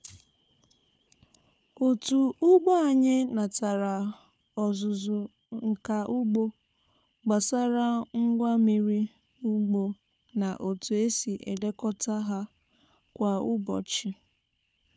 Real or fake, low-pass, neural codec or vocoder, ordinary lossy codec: fake; none; codec, 16 kHz, 4 kbps, FunCodec, trained on Chinese and English, 50 frames a second; none